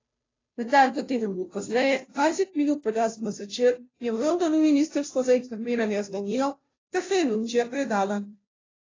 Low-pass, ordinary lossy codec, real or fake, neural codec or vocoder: 7.2 kHz; AAC, 32 kbps; fake; codec, 16 kHz, 0.5 kbps, FunCodec, trained on Chinese and English, 25 frames a second